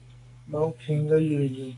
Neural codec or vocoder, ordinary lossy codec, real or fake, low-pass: codec, 44.1 kHz, 2.6 kbps, SNAC; AAC, 48 kbps; fake; 10.8 kHz